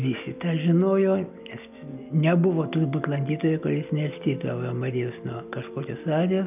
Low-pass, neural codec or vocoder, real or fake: 3.6 kHz; none; real